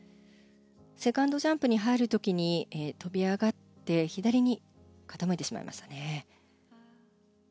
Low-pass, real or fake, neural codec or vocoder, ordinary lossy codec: none; real; none; none